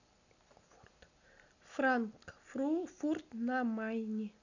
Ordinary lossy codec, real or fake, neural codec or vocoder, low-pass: none; real; none; 7.2 kHz